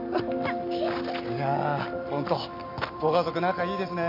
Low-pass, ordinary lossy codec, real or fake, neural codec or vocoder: 5.4 kHz; MP3, 48 kbps; real; none